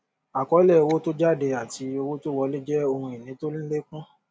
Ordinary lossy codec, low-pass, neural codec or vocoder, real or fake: none; none; none; real